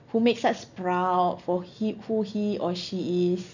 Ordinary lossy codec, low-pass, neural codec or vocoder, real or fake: none; 7.2 kHz; none; real